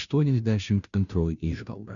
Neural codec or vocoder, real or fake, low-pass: codec, 16 kHz, 0.5 kbps, FunCodec, trained on Chinese and English, 25 frames a second; fake; 7.2 kHz